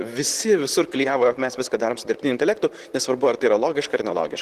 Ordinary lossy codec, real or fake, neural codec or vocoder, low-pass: Opus, 16 kbps; fake; vocoder, 44.1 kHz, 128 mel bands, Pupu-Vocoder; 14.4 kHz